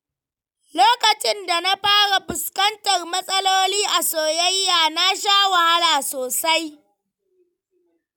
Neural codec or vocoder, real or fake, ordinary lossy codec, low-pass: none; real; none; 19.8 kHz